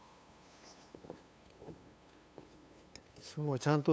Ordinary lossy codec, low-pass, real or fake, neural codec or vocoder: none; none; fake; codec, 16 kHz, 2 kbps, FunCodec, trained on LibriTTS, 25 frames a second